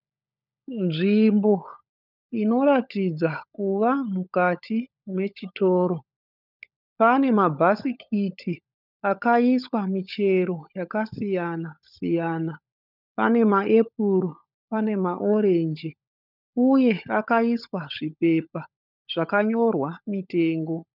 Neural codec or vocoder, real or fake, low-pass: codec, 16 kHz, 16 kbps, FunCodec, trained on LibriTTS, 50 frames a second; fake; 5.4 kHz